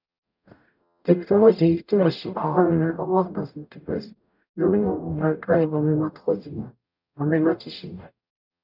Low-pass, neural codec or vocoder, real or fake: 5.4 kHz; codec, 44.1 kHz, 0.9 kbps, DAC; fake